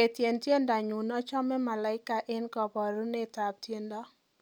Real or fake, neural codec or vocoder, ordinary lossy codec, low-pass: real; none; none; none